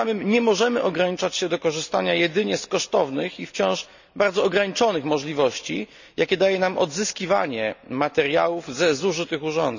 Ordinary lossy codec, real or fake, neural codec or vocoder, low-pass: none; real; none; 7.2 kHz